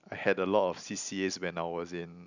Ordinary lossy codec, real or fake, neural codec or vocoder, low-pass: none; real; none; 7.2 kHz